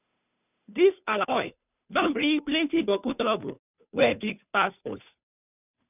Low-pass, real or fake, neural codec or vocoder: 3.6 kHz; fake; codec, 16 kHz, 2 kbps, FunCodec, trained on Chinese and English, 25 frames a second